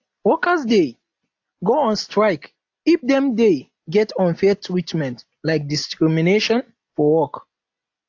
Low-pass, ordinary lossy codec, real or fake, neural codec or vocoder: 7.2 kHz; AAC, 48 kbps; real; none